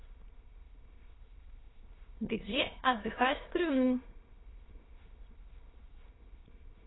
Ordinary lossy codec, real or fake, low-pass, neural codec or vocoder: AAC, 16 kbps; fake; 7.2 kHz; autoencoder, 22.05 kHz, a latent of 192 numbers a frame, VITS, trained on many speakers